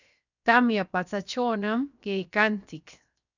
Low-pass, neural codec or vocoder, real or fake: 7.2 kHz; codec, 16 kHz, 0.7 kbps, FocalCodec; fake